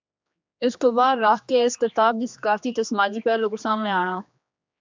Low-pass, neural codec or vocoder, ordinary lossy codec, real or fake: 7.2 kHz; codec, 16 kHz, 2 kbps, X-Codec, HuBERT features, trained on general audio; MP3, 64 kbps; fake